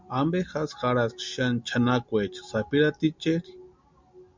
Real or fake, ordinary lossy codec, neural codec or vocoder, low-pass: real; MP3, 64 kbps; none; 7.2 kHz